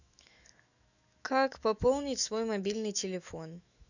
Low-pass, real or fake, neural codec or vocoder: 7.2 kHz; fake; autoencoder, 48 kHz, 128 numbers a frame, DAC-VAE, trained on Japanese speech